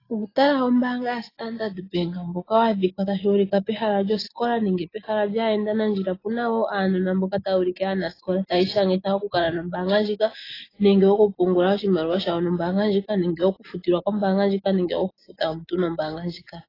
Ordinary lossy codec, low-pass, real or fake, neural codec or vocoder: AAC, 24 kbps; 5.4 kHz; real; none